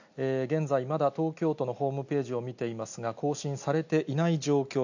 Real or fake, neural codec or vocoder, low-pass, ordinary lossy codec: real; none; 7.2 kHz; none